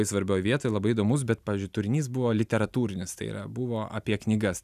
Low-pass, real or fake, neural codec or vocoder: 14.4 kHz; real; none